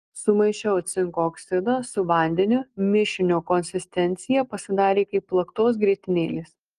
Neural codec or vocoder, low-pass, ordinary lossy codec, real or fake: none; 9.9 kHz; Opus, 24 kbps; real